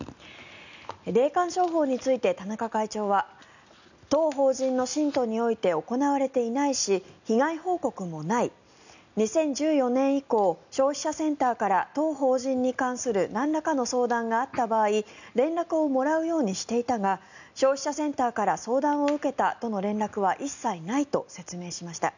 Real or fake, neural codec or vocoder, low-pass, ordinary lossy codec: real; none; 7.2 kHz; none